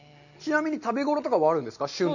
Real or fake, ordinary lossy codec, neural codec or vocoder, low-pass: real; none; none; 7.2 kHz